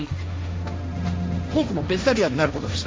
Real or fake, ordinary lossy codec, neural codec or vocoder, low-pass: fake; none; codec, 16 kHz, 1.1 kbps, Voila-Tokenizer; none